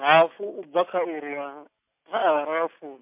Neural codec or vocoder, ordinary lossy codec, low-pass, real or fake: none; MP3, 32 kbps; 3.6 kHz; real